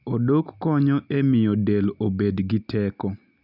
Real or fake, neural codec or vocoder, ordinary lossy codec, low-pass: real; none; none; 5.4 kHz